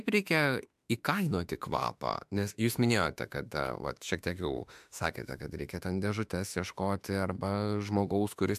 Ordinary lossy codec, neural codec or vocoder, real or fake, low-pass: MP3, 96 kbps; autoencoder, 48 kHz, 32 numbers a frame, DAC-VAE, trained on Japanese speech; fake; 14.4 kHz